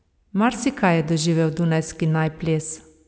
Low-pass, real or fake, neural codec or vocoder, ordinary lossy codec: none; real; none; none